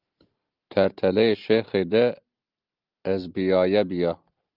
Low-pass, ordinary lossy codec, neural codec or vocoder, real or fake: 5.4 kHz; Opus, 32 kbps; none; real